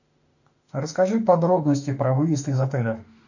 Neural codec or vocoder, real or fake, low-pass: autoencoder, 48 kHz, 32 numbers a frame, DAC-VAE, trained on Japanese speech; fake; 7.2 kHz